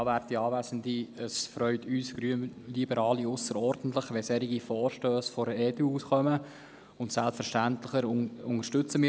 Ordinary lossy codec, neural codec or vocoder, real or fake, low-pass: none; none; real; none